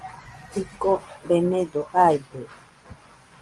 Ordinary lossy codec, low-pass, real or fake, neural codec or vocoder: Opus, 32 kbps; 10.8 kHz; fake; vocoder, 24 kHz, 100 mel bands, Vocos